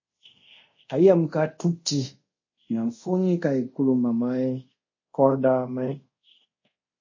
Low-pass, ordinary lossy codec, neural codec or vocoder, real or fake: 7.2 kHz; MP3, 32 kbps; codec, 24 kHz, 0.5 kbps, DualCodec; fake